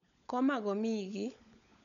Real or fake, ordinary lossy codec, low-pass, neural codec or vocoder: fake; none; 7.2 kHz; codec, 16 kHz, 16 kbps, FunCodec, trained on LibriTTS, 50 frames a second